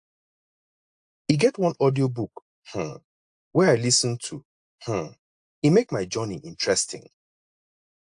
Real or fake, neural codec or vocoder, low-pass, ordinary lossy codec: real; none; 9.9 kHz; AAC, 64 kbps